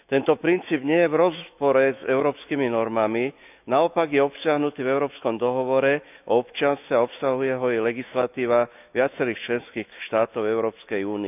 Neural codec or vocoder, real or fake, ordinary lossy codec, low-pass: autoencoder, 48 kHz, 128 numbers a frame, DAC-VAE, trained on Japanese speech; fake; none; 3.6 kHz